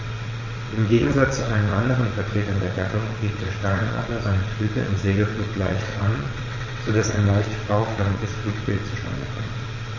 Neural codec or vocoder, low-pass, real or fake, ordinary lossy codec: vocoder, 22.05 kHz, 80 mel bands, WaveNeXt; 7.2 kHz; fake; MP3, 32 kbps